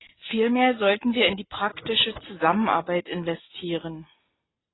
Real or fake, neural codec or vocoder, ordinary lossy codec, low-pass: real; none; AAC, 16 kbps; 7.2 kHz